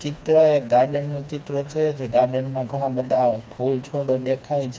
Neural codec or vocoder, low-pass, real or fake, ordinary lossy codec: codec, 16 kHz, 2 kbps, FreqCodec, smaller model; none; fake; none